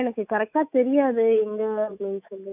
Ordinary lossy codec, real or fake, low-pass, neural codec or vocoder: AAC, 32 kbps; fake; 3.6 kHz; autoencoder, 48 kHz, 128 numbers a frame, DAC-VAE, trained on Japanese speech